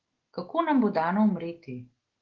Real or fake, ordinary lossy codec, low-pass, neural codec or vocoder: real; Opus, 16 kbps; 7.2 kHz; none